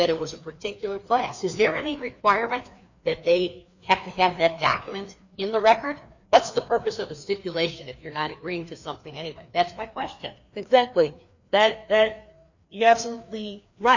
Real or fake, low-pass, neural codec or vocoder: fake; 7.2 kHz; codec, 16 kHz, 2 kbps, FreqCodec, larger model